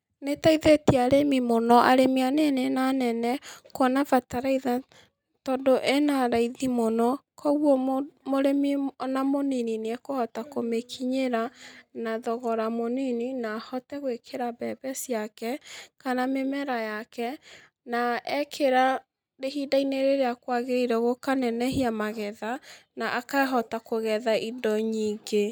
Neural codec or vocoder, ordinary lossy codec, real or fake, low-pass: none; none; real; none